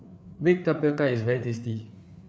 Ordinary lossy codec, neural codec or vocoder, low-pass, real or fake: none; codec, 16 kHz, 4 kbps, FreqCodec, larger model; none; fake